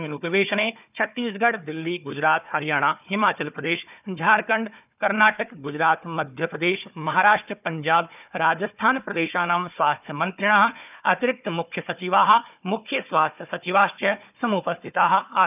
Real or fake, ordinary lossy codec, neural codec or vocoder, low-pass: fake; none; codec, 16 kHz, 4 kbps, FunCodec, trained on Chinese and English, 50 frames a second; 3.6 kHz